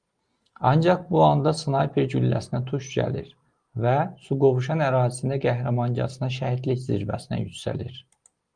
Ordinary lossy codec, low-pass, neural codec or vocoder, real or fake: Opus, 32 kbps; 9.9 kHz; none; real